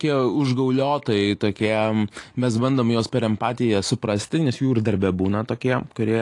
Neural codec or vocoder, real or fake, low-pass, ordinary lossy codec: none; real; 10.8 kHz; AAC, 48 kbps